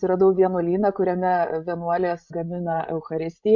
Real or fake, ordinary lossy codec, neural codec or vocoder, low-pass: fake; MP3, 64 kbps; codec, 16 kHz, 16 kbps, FreqCodec, larger model; 7.2 kHz